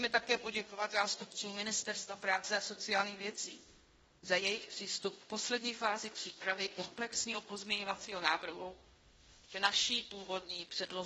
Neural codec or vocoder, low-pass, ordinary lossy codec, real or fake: codec, 16 kHz in and 24 kHz out, 0.9 kbps, LongCat-Audio-Codec, fine tuned four codebook decoder; 10.8 kHz; AAC, 24 kbps; fake